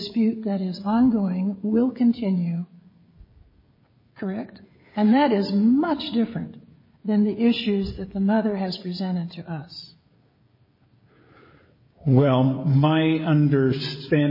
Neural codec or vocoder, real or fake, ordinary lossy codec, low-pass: codec, 16 kHz, 16 kbps, FreqCodec, smaller model; fake; MP3, 24 kbps; 5.4 kHz